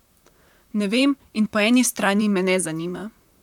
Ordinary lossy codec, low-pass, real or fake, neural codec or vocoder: none; 19.8 kHz; fake; vocoder, 44.1 kHz, 128 mel bands, Pupu-Vocoder